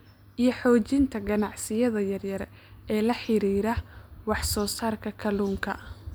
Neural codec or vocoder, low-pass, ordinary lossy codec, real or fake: none; none; none; real